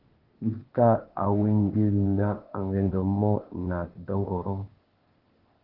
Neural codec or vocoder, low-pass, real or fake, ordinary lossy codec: codec, 16 kHz, 0.8 kbps, ZipCodec; 5.4 kHz; fake; Opus, 16 kbps